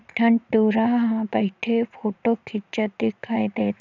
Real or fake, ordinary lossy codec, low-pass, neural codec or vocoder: fake; none; 7.2 kHz; vocoder, 44.1 kHz, 80 mel bands, Vocos